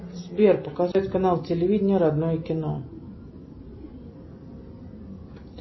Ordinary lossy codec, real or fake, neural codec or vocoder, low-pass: MP3, 24 kbps; real; none; 7.2 kHz